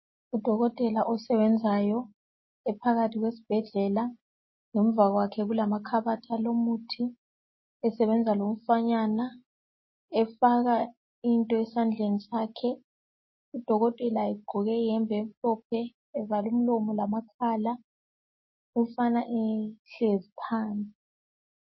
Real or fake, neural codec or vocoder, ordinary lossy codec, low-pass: real; none; MP3, 24 kbps; 7.2 kHz